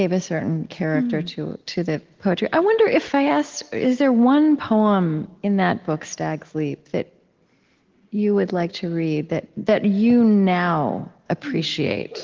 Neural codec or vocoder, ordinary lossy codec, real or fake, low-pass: none; Opus, 16 kbps; real; 7.2 kHz